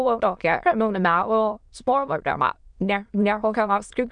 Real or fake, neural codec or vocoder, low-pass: fake; autoencoder, 22.05 kHz, a latent of 192 numbers a frame, VITS, trained on many speakers; 9.9 kHz